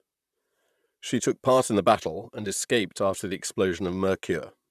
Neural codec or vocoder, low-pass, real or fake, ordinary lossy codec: vocoder, 44.1 kHz, 128 mel bands, Pupu-Vocoder; 14.4 kHz; fake; none